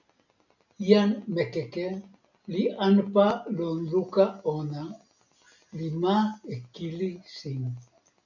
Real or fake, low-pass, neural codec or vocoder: real; 7.2 kHz; none